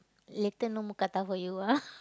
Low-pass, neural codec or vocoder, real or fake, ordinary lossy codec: none; none; real; none